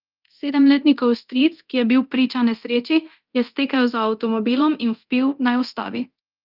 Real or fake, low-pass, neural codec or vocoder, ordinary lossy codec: fake; 5.4 kHz; codec, 24 kHz, 0.9 kbps, DualCodec; Opus, 24 kbps